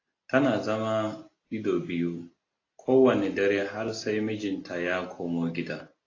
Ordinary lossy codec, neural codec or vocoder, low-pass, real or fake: AAC, 32 kbps; none; 7.2 kHz; real